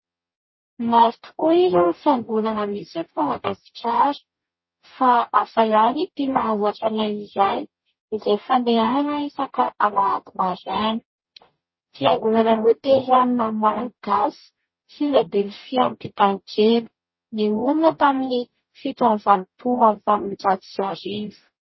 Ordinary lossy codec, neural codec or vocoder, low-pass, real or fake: MP3, 24 kbps; codec, 44.1 kHz, 0.9 kbps, DAC; 7.2 kHz; fake